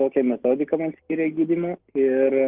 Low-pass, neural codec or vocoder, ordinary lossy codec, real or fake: 3.6 kHz; none; Opus, 16 kbps; real